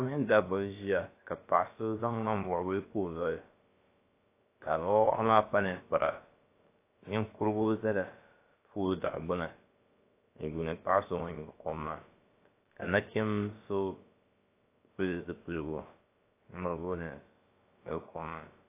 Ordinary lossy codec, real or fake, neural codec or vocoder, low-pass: AAC, 24 kbps; fake; codec, 16 kHz, about 1 kbps, DyCAST, with the encoder's durations; 3.6 kHz